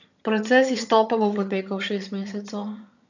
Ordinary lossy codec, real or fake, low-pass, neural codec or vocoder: none; fake; 7.2 kHz; vocoder, 22.05 kHz, 80 mel bands, HiFi-GAN